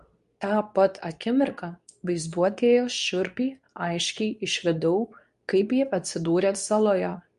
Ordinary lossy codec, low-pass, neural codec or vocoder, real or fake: MP3, 64 kbps; 10.8 kHz; codec, 24 kHz, 0.9 kbps, WavTokenizer, medium speech release version 2; fake